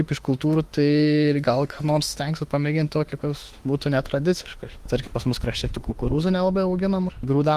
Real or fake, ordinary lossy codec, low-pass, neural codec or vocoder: fake; Opus, 24 kbps; 14.4 kHz; autoencoder, 48 kHz, 32 numbers a frame, DAC-VAE, trained on Japanese speech